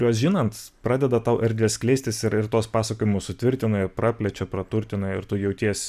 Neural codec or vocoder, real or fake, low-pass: none; real; 14.4 kHz